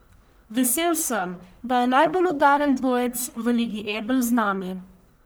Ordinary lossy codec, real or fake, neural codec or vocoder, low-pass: none; fake; codec, 44.1 kHz, 1.7 kbps, Pupu-Codec; none